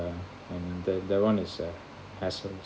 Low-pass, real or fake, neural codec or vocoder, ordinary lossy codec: none; real; none; none